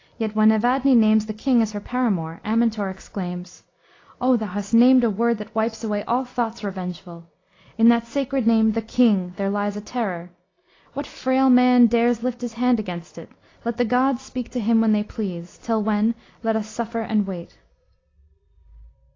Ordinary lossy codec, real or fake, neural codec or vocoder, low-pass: AAC, 32 kbps; real; none; 7.2 kHz